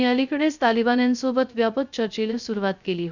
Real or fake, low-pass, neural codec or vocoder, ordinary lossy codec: fake; 7.2 kHz; codec, 16 kHz, 0.3 kbps, FocalCodec; none